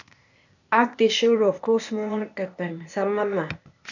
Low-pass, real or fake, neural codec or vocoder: 7.2 kHz; fake; codec, 16 kHz, 0.8 kbps, ZipCodec